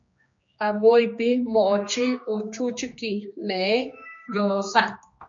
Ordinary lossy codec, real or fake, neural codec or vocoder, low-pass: MP3, 48 kbps; fake; codec, 16 kHz, 2 kbps, X-Codec, HuBERT features, trained on general audio; 7.2 kHz